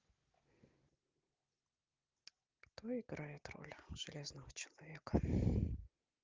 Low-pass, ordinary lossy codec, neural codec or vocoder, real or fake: 7.2 kHz; Opus, 32 kbps; none; real